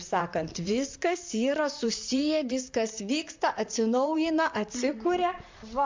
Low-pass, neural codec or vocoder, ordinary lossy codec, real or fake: 7.2 kHz; vocoder, 44.1 kHz, 128 mel bands, Pupu-Vocoder; MP3, 64 kbps; fake